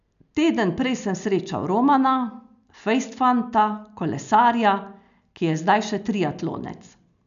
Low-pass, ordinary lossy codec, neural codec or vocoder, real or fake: 7.2 kHz; none; none; real